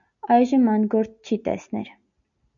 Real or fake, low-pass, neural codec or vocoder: real; 7.2 kHz; none